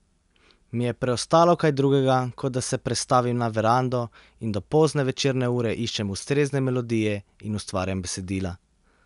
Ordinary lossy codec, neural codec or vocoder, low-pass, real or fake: none; none; 10.8 kHz; real